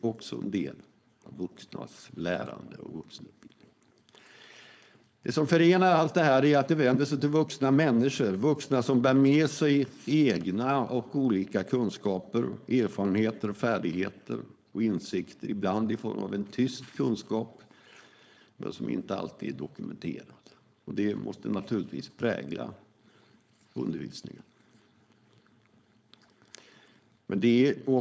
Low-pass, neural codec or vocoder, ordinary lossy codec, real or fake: none; codec, 16 kHz, 4.8 kbps, FACodec; none; fake